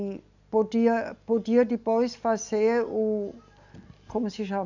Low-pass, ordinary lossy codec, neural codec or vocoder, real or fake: 7.2 kHz; none; none; real